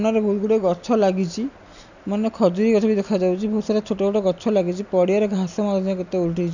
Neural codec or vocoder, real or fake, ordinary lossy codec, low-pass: none; real; none; 7.2 kHz